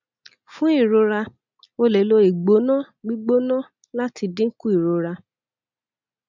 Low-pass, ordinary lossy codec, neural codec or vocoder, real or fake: 7.2 kHz; none; none; real